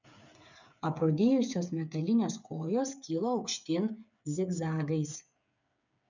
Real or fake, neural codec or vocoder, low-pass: fake; codec, 16 kHz, 8 kbps, FreqCodec, smaller model; 7.2 kHz